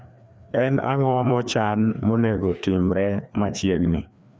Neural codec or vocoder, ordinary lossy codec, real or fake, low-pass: codec, 16 kHz, 2 kbps, FreqCodec, larger model; none; fake; none